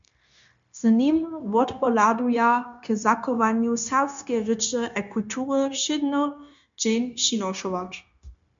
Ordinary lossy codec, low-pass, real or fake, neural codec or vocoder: MP3, 64 kbps; 7.2 kHz; fake; codec, 16 kHz, 0.9 kbps, LongCat-Audio-Codec